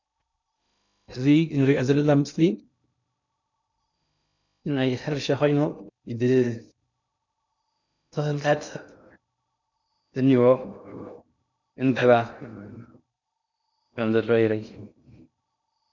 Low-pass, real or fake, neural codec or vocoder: 7.2 kHz; fake; codec, 16 kHz in and 24 kHz out, 0.6 kbps, FocalCodec, streaming, 2048 codes